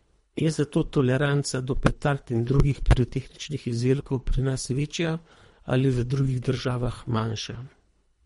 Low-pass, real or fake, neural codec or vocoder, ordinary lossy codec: 10.8 kHz; fake; codec, 24 kHz, 3 kbps, HILCodec; MP3, 48 kbps